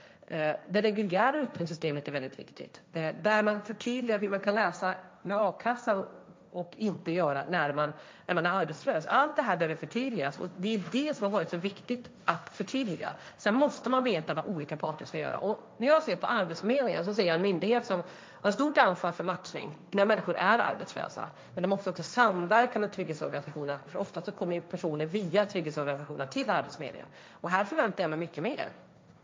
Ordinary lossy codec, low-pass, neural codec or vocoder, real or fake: none; none; codec, 16 kHz, 1.1 kbps, Voila-Tokenizer; fake